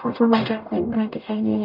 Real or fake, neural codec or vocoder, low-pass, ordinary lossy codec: fake; codec, 44.1 kHz, 0.9 kbps, DAC; 5.4 kHz; none